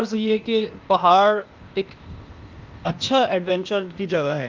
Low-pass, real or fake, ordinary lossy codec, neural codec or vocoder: 7.2 kHz; fake; Opus, 24 kbps; codec, 16 kHz, 0.8 kbps, ZipCodec